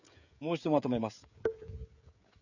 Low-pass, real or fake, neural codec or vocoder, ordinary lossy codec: 7.2 kHz; fake; codec, 16 kHz, 16 kbps, FreqCodec, smaller model; none